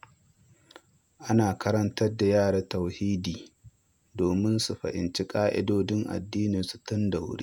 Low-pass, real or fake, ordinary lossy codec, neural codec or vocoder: 19.8 kHz; fake; none; vocoder, 48 kHz, 128 mel bands, Vocos